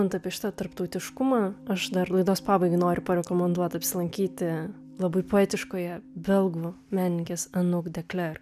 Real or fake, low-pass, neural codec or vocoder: real; 14.4 kHz; none